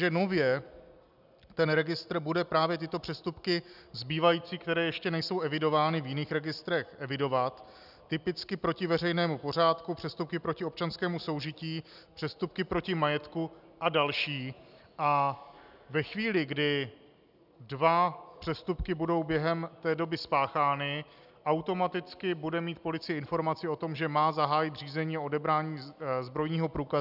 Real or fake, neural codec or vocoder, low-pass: real; none; 5.4 kHz